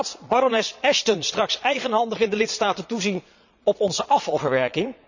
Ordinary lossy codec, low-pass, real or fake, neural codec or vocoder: none; 7.2 kHz; fake; vocoder, 22.05 kHz, 80 mel bands, Vocos